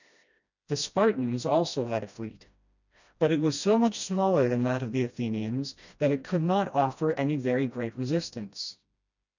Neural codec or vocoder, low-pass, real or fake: codec, 16 kHz, 1 kbps, FreqCodec, smaller model; 7.2 kHz; fake